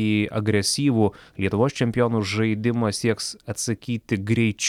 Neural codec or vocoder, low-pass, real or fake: none; 19.8 kHz; real